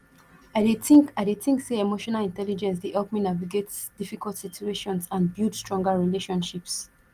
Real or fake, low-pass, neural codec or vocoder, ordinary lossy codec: real; 14.4 kHz; none; Opus, 24 kbps